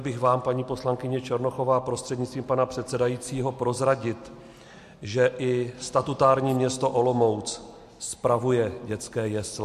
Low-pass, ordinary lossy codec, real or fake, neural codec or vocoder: 14.4 kHz; MP3, 64 kbps; real; none